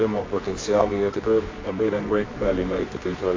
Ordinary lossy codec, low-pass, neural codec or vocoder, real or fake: AAC, 32 kbps; 7.2 kHz; codec, 24 kHz, 0.9 kbps, WavTokenizer, medium music audio release; fake